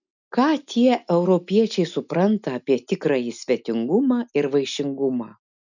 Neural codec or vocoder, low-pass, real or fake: none; 7.2 kHz; real